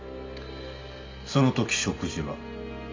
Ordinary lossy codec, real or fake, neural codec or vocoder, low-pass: MP3, 48 kbps; real; none; 7.2 kHz